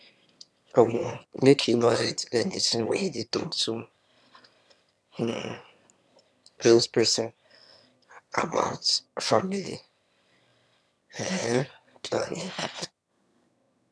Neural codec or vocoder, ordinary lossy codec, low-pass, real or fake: autoencoder, 22.05 kHz, a latent of 192 numbers a frame, VITS, trained on one speaker; none; none; fake